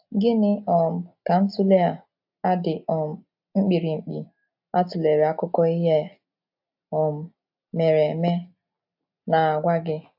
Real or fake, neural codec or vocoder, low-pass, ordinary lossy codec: real; none; 5.4 kHz; AAC, 48 kbps